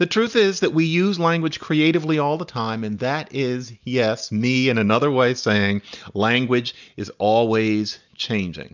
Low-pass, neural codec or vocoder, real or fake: 7.2 kHz; none; real